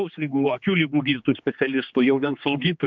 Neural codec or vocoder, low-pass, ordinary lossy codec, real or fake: codec, 16 kHz, 2 kbps, X-Codec, HuBERT features, trained on general audio; 7.2 kHz; MP3, 64 kbps; fake